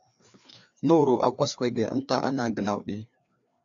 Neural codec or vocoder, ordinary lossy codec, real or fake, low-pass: codec, 16 kHz, 2 kbps, FreqCodec, larger model; AAC, 64 kbps; fake; 7.2 kHz